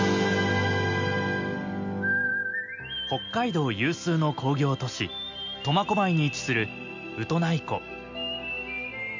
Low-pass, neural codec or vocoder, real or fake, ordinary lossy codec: 7.2 kHz; none; real; MP3, 64 kbps